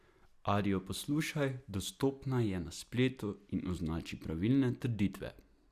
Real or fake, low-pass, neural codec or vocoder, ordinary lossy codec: real; 14.4 kHz; none; Opus, 64 kbps